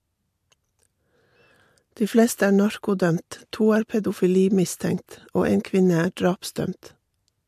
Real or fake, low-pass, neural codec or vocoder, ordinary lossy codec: real; 14.4 kHz; none; MP3, 64 kbps